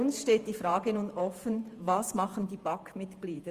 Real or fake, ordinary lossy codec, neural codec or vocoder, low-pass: fake; Opus, 32 kbps; vocoder, 48 kHz, 128 mel bands, Vocos; 14.4 kHz